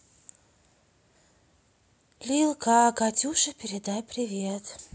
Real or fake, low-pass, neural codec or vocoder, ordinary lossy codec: real; none; none; none